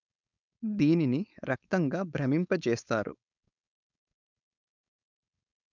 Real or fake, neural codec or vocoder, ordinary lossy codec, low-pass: fake; codec, 16 kHz, 4.8 kbps, FACodec; none; 7.2 kHz